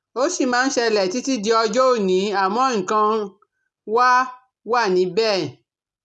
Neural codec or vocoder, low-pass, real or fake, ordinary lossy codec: none; none; real; none